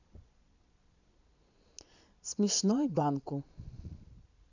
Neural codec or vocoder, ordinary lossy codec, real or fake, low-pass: vocoder, 22.05 kHz, 80 mel bands, Vocos; none; fake; 7.2 kHz